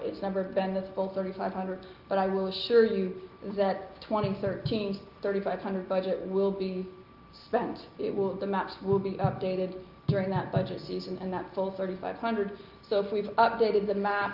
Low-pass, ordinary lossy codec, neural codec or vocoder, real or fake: 5.4 kHz; Opus, 32 kbps; none; real